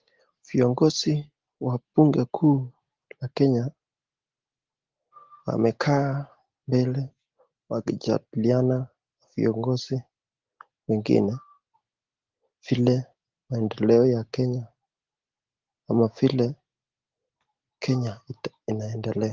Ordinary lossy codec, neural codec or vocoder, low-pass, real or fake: Opus, 16 kbps; none; 7.2 kHz; real